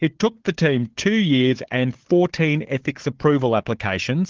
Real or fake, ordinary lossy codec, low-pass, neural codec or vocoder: fake; Opus, 16 kbps; 7.2 kHz; codec, 16 kHz, 4 kbps, FunCodec, trained on Chinese and English, 50 frames a second